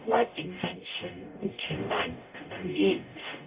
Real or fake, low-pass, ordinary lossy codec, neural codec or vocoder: fake; 3.6 kHz; Opus, 64 kbps; codec, 44.1 kHz, 0.9 kbps, DAC